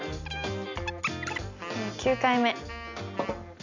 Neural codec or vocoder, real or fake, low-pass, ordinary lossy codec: none; real; 7.2 kHz; none